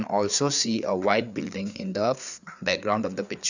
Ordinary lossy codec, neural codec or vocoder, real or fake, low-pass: none; codec, 16 kHz, 4 kbps, FreqCodec, larger model; fake; 7.2 kHz